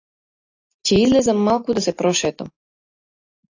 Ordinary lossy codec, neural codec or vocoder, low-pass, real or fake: AAC, 48 kbps; none; 7.2 kHz; real